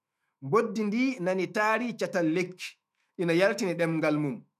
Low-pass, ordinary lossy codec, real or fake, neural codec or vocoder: 14.4 kHz; none; fake; autoencoder, 48 kHz, 128 numbers a frame, DAC-VAE, trained on Japanese speech